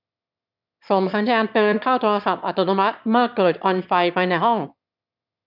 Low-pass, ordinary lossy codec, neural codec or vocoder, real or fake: 5.4 kHz; none; autoencoder, 22.05 kHz, a latent of 192 numbers a frame, VITS, trained on one speaker; fake